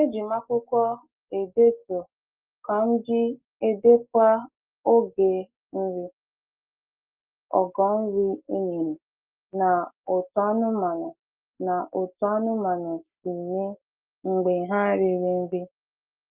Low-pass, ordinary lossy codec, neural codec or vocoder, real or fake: 3.6 kHz; Opus, 32 kbps; none; real